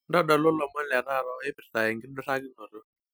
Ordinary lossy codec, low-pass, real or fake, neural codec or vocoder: none; none; real; none